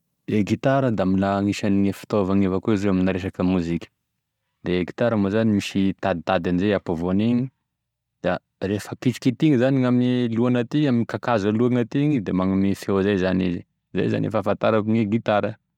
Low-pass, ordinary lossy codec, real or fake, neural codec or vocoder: 19.8 kHz; MP3, 96 kbps; real; none